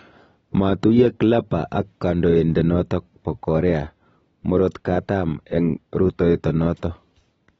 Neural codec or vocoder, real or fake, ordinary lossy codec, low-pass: none; real; AAC, 24 kbps; 19.8 kHz